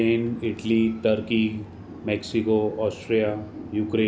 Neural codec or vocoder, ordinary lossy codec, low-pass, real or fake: none; none; none; real